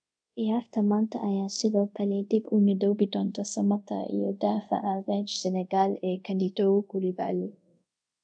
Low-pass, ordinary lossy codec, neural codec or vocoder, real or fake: 9.9 kHz; none; codec, 24 kHz, 0.5 kbps, DualCodec; fake